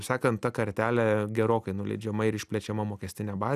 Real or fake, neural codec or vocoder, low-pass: real; none; 14.4 kHz